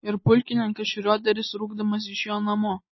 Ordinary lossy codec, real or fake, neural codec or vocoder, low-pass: MP3, 24 kbps; real; none; 7.2 kHz